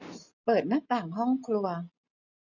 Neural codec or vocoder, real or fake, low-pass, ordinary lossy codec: none; real; 7.2 kHz; none